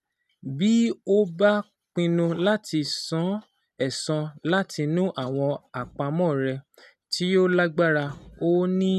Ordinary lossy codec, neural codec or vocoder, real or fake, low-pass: none; none; real; 14.4 kHz